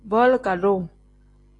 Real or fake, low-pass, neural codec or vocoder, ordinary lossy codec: real; 10.8 kHz; none; AAC, 48 kbps